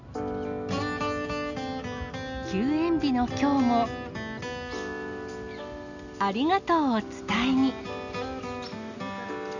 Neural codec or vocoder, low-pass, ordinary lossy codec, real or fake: none; 7.2 kHz; none; real